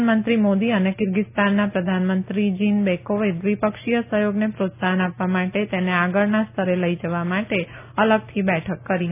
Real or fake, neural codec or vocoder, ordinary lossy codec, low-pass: real; none; none; 3.6 kHz